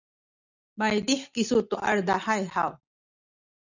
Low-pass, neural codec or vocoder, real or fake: 7.2 kHz; none; real